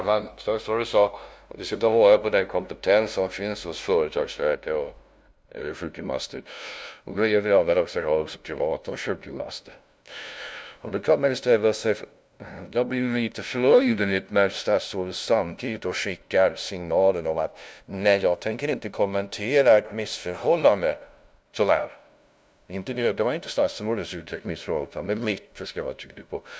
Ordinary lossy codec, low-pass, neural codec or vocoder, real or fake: none; none; codec, 16 kHz, 0.5 kbps, FunCodec, trained on LibriTTS, 25 frames a second; fake